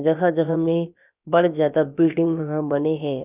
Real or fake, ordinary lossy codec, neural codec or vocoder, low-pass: fake; none; codec, 16 kHz, about 1 kbps, DyCAST, with the encoder's durations; 3.6 kHz